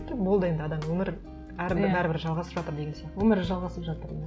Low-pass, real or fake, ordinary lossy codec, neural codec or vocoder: none; real; none; none